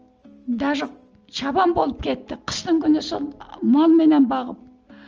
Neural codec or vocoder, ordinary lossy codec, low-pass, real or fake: none; Opus, 24 kbps; 7.2 kHz; real